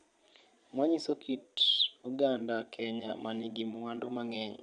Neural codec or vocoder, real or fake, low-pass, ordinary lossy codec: vocoder, 22.05 kHz, 80 mel bands, Vocos; fake; 9.9 kHz; none